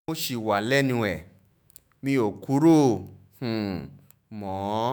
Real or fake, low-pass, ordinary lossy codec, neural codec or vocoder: fake; none; none; autoencoder, 48 kHz, 128 numbers a frame, DAC-VAE, trained on Japanese speech